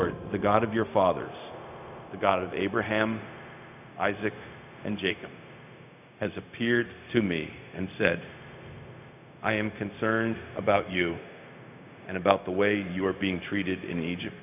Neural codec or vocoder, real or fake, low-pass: codec, 16 kHz, 0.4 kbps, LongCat-Audio-Codec; fake; 3.6 kHz